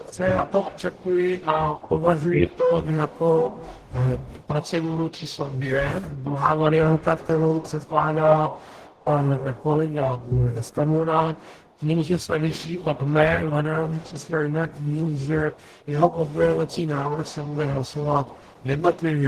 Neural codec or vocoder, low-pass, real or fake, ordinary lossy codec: codec, 44.1 kHz, 0.9 kbps, DAC; 14.4 kHz; fake; Opus, 16 kbps